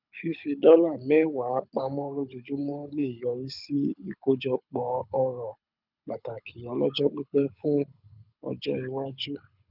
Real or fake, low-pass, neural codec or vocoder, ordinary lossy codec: fake; 5.4 kHz; codec, 24 kHz, 6 kbps, HILCodec; none